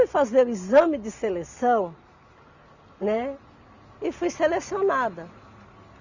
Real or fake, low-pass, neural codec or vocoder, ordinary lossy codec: real; 7.2 kHz; none; Opus, 64 kbps